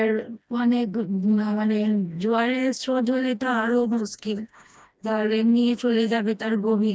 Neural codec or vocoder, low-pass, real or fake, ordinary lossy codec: codec, 16 kHz, 1 kbps, FreqCodec, smaller model; none; fake; none